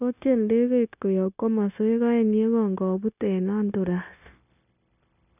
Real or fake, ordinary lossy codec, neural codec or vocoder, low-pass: fake; none; codec, 16 kHz, 0.9 kbps, LongCat-Audio-Codec; 3.6 kHz